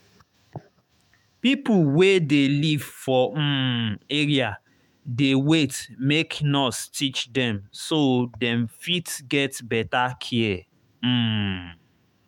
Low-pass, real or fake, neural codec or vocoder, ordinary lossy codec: 19.8 kHz; fake; autoencoder, 48 kHz, 128 numbers a frame, DAC-VAE, trained on Japanese speech; MP3, 96 kbps